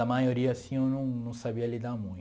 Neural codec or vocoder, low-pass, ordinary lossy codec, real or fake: none; none; none; real